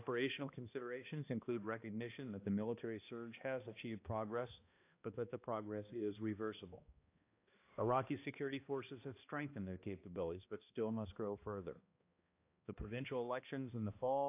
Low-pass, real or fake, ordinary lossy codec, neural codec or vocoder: 3.6 kHz; fake; AAC, 32 kbps; codec, 16 kHz, 1 kbps, X-Codec, HuBERT features, trained on balanced general audio